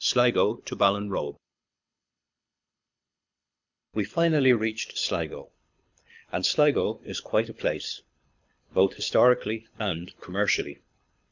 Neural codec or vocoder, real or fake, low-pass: codec, 24 kHz, 6 kbps, HILCodec; fake; 7.2 kHz